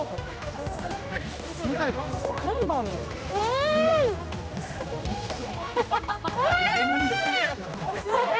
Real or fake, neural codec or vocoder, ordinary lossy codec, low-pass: fake; codec, 16 kHz, 1 kbps, X-Codec, HuBERT features, trained on general audio; none; none